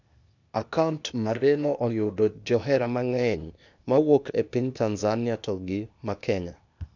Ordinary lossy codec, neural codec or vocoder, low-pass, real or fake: none; codec, 16 kHz, 0.8 kbps, ZipCodec; 7.2 kHz; fake